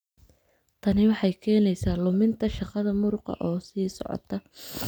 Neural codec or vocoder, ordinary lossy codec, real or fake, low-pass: none; none; real; none